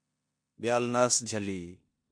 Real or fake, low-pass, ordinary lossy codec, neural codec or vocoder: fake; 9.9 kHz; MP3, 48 kbps; codec, 16 kHz in and 24 kHz out, 0.9 kbps, LongCat-Audio-Codec, four codebook decoder